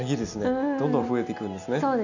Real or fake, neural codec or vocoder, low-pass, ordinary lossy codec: real; none; 7.2 kHz; none